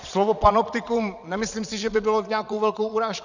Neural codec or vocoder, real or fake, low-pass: vocoder, 22.05 kHz, 80 mel bands, Vocos; fake; 7.2 kHz